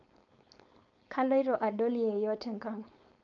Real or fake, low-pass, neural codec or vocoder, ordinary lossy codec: fake; 7.2 kHz; codec, 16 kHz, 4.8 kbps, FACodec; none